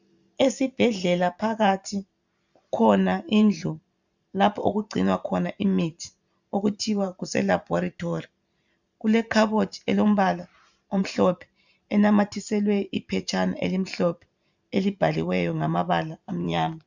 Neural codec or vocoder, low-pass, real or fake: vocoder, 44.1 kHz, 128 mel bands every 512 samples, BigVGAN v2; 7.2 kHz; fake